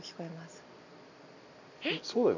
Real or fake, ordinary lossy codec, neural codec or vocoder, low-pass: real; none; none; 7.2 kHz